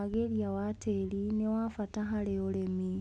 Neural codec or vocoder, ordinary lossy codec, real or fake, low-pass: none; none; real; none